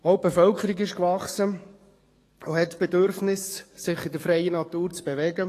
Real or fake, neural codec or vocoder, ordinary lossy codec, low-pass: fake; codec, 44.1 kHz, 7.8 kbps, DAC; AAC, 48 kbps; 14.4 kHz